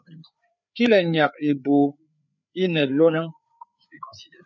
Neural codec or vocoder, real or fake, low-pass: codec, 16 kHz, 4 kbps, FreqCodec, larger model; fake; 7.2 kHz